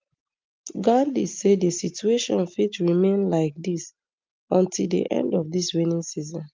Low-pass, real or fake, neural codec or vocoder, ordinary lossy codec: 7.2 kHz; real; none; Opus, 24 kbps